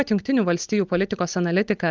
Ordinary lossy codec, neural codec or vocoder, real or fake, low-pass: Opus, 32 kbps; none; real; 7.2 kHz